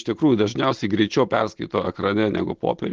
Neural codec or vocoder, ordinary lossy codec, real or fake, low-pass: none; Opus, 16 kbps; real; 7.2 kHz